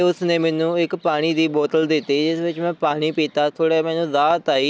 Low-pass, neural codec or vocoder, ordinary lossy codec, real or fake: none; none; none; real